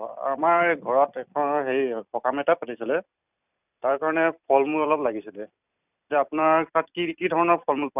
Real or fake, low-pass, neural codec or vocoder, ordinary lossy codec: fake; 3.6 kHz; vocoder, 44.1 kHz, 128 mel bands every 256 samples, BigVGAN v2; none